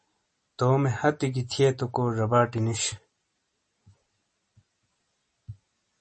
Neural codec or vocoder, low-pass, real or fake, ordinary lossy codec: none; 10.8 kHz; real; MP3, 32 kbps